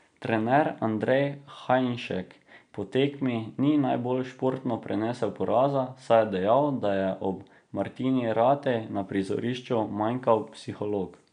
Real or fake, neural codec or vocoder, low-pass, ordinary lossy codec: real; none; 9.9 kHz; none